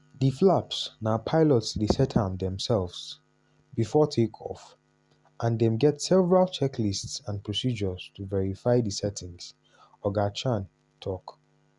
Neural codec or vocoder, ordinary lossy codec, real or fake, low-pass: none; Opus, 64 kbps; real; 10.8 kHz